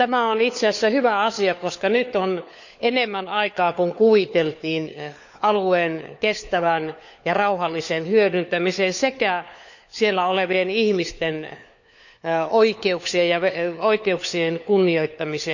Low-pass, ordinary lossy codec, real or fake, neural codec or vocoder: 7.2 kHz; none; fake; codec, 16 kHz, 4 kbps, FunCodec, trained on LibriTTS, 50 frames a second